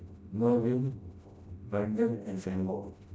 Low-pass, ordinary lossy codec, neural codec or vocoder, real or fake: none; none; codec, 16 kHz, 0.5 kbps, FreqCodec, smaller model; fake